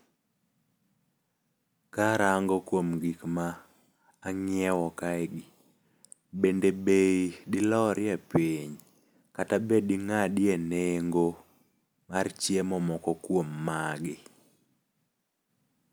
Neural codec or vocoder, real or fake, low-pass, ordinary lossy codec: none; real; none; none